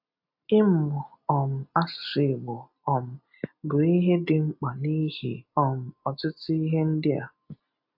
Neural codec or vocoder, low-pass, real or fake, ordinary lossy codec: none; 5.4 kHz; real; AAC, 48 kbps